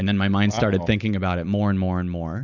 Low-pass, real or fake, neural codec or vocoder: 7.2 kHz; real; none